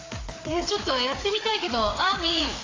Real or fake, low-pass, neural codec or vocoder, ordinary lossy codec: fake; 7.2 kHz; codec, 16 kHz, 4 kbps, FreqCodec, larger model; AAC, 32 kbps